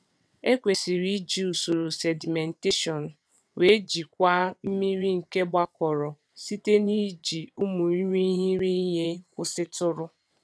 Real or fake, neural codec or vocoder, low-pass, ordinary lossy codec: fake; vocoder, 22.05 kHz, 80 mel bands, WaveNeXt; none; none